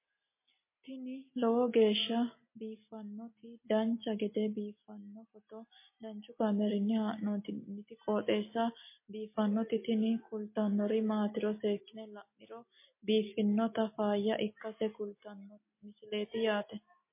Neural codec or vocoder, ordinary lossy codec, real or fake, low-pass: none; MP3, 24 kbps; real; 3.6 kHz